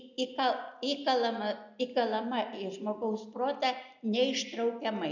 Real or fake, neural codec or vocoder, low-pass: real; none; 7.2 kHz